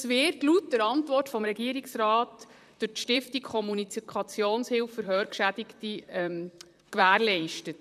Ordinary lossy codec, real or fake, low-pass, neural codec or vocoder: none; fake; 14.4 kHz; vocoder, 44.1 kHz, 128 mel bands, Pupu-Vocoder